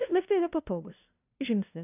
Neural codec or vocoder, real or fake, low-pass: codec, 16 kHz, 0.5 kbps, FunCodec, trained on LibriTTS, 25 frames a second; fake; 3.6 kHz